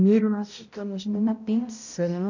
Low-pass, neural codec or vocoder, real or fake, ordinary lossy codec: 7.2 kHz; codec, 16 kHz, 0.5 kbps, X-Codec, HuBERT features, trained on balanced general audio; fake; none